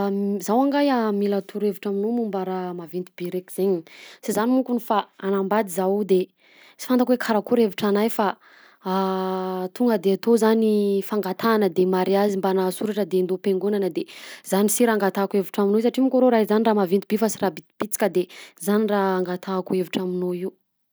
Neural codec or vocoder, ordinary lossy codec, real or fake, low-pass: none; none; real; none